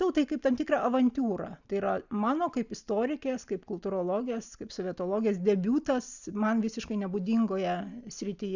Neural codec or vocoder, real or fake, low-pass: none; real; 7.2 kHz